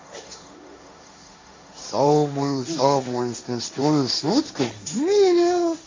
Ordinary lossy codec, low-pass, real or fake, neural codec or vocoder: MP3, 48 kbps; 7.2 kHz; fake; codec, 16 kHz, 1.1 kbps, Voila-Tokenizer